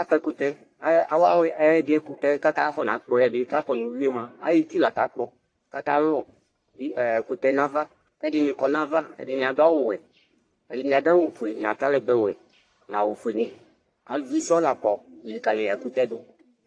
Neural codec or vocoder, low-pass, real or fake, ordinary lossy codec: codec, 44.1 kHz, 1.7 kbps, Pupu-Codec; 9.9 kHz; fake; AAC, 48 kbps